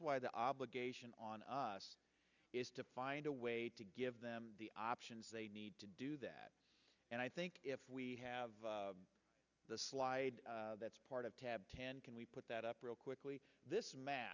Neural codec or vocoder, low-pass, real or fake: none; 7.2 kHz; real